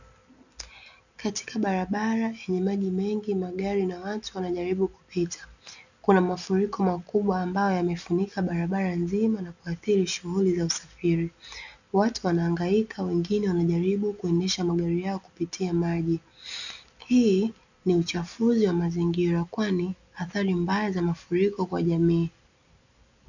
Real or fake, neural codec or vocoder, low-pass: real; none; 7.2 kHz